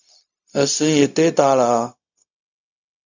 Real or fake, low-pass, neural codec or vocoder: fake; 7.2 kHz; codec, 16 kHz, 0.4 kbps, LongCat-Audio-Codec